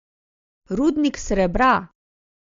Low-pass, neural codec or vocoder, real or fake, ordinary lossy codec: 7.2 kHz; none; real; MP3, 48 kbps